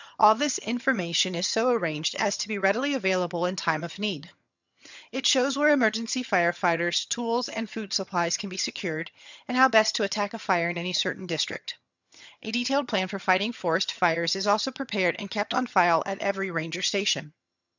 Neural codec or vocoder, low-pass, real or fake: vocoder, 22.05 kHz, 80 mel bands, HiFi-GAN; 7.2 kHz; fake